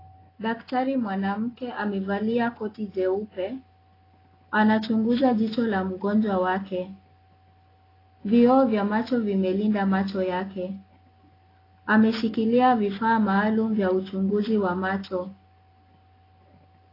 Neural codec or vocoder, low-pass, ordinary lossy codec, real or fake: none; 5.4 kHz; AAC, 24 kbps; real